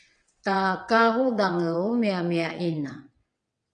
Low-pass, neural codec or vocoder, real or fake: 9.9 kHz; vocoder, 22.05 kHz, 80 mel bands, WaveNeXt; fake